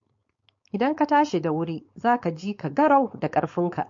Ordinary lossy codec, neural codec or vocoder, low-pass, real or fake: MP3, 48 kbps; codec, 16 kHz, 4.8 kbps, FACodec; 7.2 kHz; fake